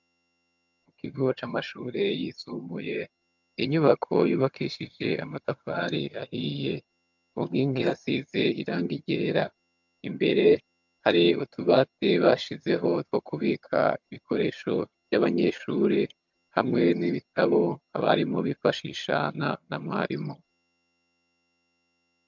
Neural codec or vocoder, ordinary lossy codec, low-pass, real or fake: vocoder, 22.05 kHz, 80 mel bands, HiFi-GAN; MP3, 64 kbps; 7.2 kHz; fake